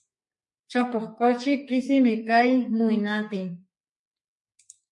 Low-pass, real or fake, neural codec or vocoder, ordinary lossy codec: 10.8 kHz; fake; codec, 32 kHz, 1.9 kbps, SNAC; MP3, 48 kbps